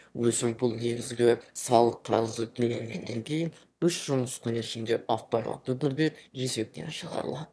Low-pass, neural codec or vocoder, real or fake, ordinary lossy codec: none; autoencoder, 22.05 kHz, a latent of 192 numbers a frame, VITS, trained on one speaker; fake; none